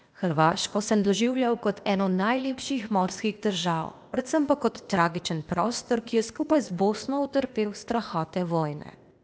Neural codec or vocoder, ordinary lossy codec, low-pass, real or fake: codec, 16 kHz, 0.8 kbps, ZipCodec; none; none; fake